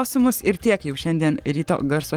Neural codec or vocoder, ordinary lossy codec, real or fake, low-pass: codec, 44.1 kHz, 7.8 kbps, Pupu-Codec; Opus, 24 kbps; fake; 19.8 kHz